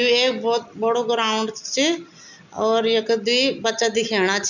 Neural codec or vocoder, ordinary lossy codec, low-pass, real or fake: none; none; 7.2 kHz; real